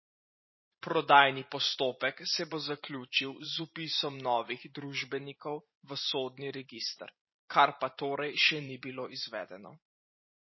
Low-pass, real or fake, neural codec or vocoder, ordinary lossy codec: 7.2 kHz; fake; vocoder, 44.1 kHz, 128 mel bands every 512 samples, BigVGAN v2; MP3, 24 kbps